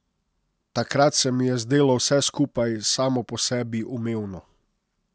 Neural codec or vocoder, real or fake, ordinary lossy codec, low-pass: none; real; none; none